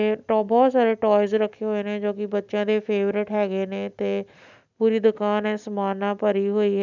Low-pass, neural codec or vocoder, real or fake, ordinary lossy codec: 7.2 kHz; none; real; none